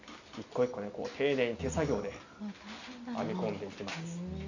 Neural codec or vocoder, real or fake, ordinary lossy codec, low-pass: none; real; none; 7.2 kHz